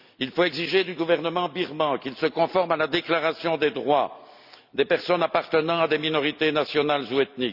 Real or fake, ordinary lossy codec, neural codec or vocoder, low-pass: real; none; none; 5.4 kHz